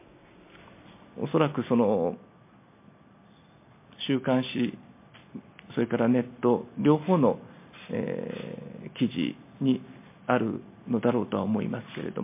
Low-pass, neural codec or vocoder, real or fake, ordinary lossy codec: 3.6 kHz; none; real; MP3, 24 kbps